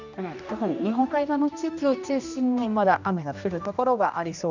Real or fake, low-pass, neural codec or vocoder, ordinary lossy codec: fake; 7.2 kHz; codec, 16 kHz, 1 kbps, X-Codec, HuBERT features, trained on general audio; none